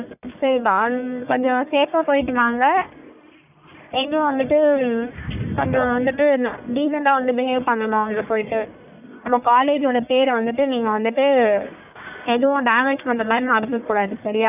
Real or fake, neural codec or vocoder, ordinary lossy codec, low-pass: fake; codec, 44.1 kHz, 1.7 kbps, Pupu-Codec; none; 3.6 kHz